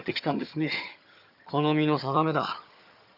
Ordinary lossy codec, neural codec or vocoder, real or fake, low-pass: none; vocoder, 22.05 kHz, 80 mel bands, HiFi-GAN; fake; 5.4 kHz